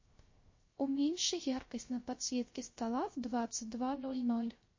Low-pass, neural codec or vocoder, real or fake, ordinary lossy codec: 7.2 kHz; codec, 16 kHz, 0.3 kbps, FocalCodec; fake; MP3, 32 kbps